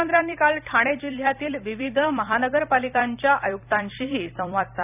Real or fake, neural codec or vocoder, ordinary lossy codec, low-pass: fake; vocoder, 44.1 kHz, 128 mel bands every 256 samples, BigVGAN v2; none; 3.6 kHz